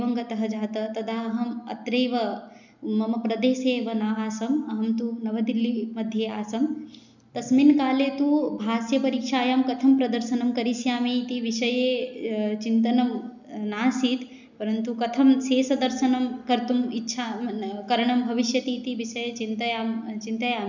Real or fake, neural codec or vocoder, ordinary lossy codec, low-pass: real; none; none; 7.2 kHz